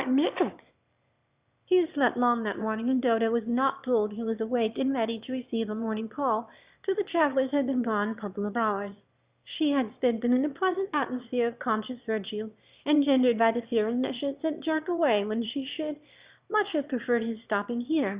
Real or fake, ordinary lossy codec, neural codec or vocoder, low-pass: fake; Opus, 64 kbps; autoencoder, 22.05 kHz, a latent of 192 numbers a frame, VITS, trained on one speaker; 3.6 kHz